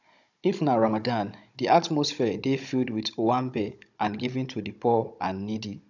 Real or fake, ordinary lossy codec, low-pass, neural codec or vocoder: fake; none; 7.2 kHz; codec, 16 kHz, 16 kbps, FunCodec, trained on Chinese and English, 50 frames a second